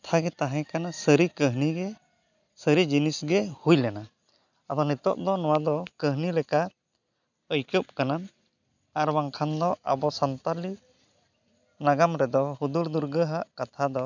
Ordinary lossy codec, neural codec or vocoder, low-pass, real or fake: none; none; 7.2 kHz; real